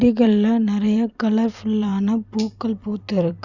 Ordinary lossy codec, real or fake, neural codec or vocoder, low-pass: Opus, 64 kbps; fake; vocoder, 44.1 kHz, 128 mel bands every 256 samples, BigVGAN v2; 7.2 kHz